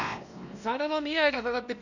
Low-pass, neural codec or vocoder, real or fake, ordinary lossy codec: 7.2 kHz; codec, 16 kHz, 1 kbps, X-Codec, WavLM features, trained on Multilingual LibriSpeech; fake; none